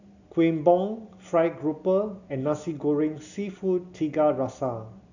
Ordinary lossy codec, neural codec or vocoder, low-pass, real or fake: none; none; 7.2 kHz; real